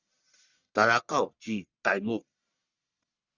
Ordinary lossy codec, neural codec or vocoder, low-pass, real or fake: Opus, 64 kbps; codec, 44.1 kHz, 1.7 kbps, Pupu-Codec; 7.2 kHz; fake